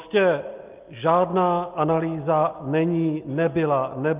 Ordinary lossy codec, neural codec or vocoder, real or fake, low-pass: Opus, 24 kbps; none; real; 3.6 kHz